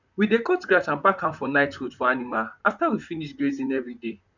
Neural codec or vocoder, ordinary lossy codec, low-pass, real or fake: vocoder, 44.1 kHz, 128 mel bands, Pupu-Vocoder; none; 7.2 kHz; fake